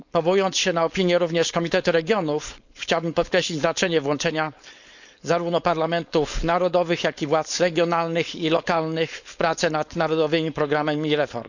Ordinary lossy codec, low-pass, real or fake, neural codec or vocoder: none; 7.2 kHz; fake; codec, 16 kHz, 4.8 kbps, FACodec